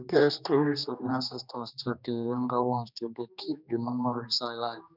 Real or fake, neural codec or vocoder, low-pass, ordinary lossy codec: fake; codec, 16 kHz, 2 kbps, X-Codec, HuBERT features, trained on general audio; 5.4 kHz; none